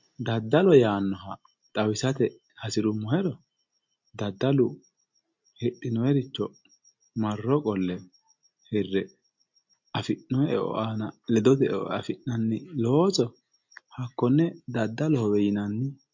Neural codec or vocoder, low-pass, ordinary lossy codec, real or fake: none; 7.2 kHz; MP3, 48 kbps; real